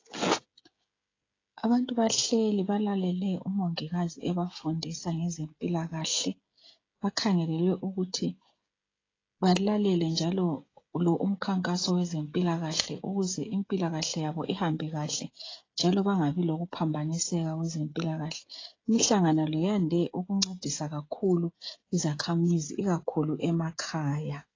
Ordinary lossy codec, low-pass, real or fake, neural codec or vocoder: AAC, 32 kbps; 7.2 kHz; fake; codec, 16 kHz, 16 kbps, FreqCodec, smaller model